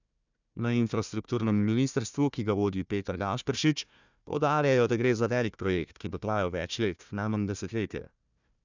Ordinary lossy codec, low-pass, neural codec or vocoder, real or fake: none; 7.2 kHz; codec, 16 kHz, 1 kbps, FunCodec, trained on Chinese and English, 50 frames a second; fake